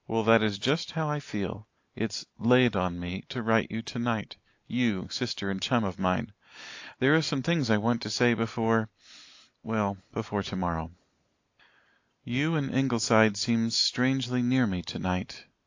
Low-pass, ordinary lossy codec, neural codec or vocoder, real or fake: 7.2 kHz; AAC, 48 kbps; none; real